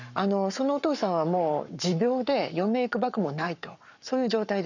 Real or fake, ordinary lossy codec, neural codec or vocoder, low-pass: fake; none; codec, 44.1 kHz, 7.8 kbps, Pupu-Codec; 7.2 kHz